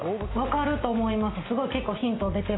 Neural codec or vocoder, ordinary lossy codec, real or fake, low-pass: none; AAC, 16 kbps; real; 7.2 kHz